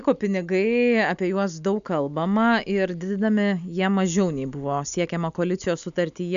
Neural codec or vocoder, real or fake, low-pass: none; real; 7.2 kHz